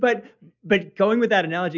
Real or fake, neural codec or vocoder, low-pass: real; none; 7.2 kHz